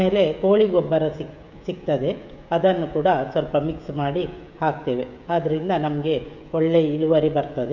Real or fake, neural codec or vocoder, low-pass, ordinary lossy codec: fake; codec, 16 kHz, 16 kbps, FreqCodec, smaller model; 7.2 kHz; none